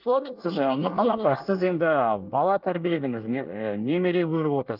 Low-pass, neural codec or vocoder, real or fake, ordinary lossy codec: 5.4 kHz; codec, 24 kHz, 1 kbps, SNAC; fake; Opus, 32 kbps